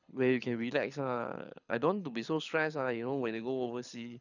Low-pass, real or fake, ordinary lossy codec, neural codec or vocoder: 7.2 kHz; fake; none; codec, 24 kHz, 6 kbps, HILCodec